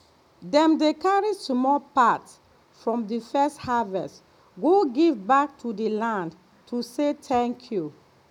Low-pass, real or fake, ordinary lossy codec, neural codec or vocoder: 19.8 kHz; real; none; none